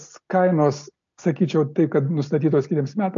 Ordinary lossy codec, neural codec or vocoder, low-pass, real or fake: AAC, 64 kbps; none; 7.2 kHz; real